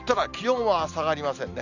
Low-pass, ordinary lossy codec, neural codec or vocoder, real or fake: 7.2 kHz; none; none; real